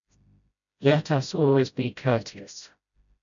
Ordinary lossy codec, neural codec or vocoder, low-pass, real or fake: MP3, 96 kbps; codec, 16 kHz, 0.5 kbps, FreqCodec, smaller model; 7.2 kHz; fake